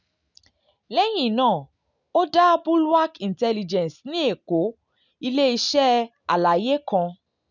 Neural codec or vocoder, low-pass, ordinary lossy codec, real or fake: none; 7.2 kHz; none; real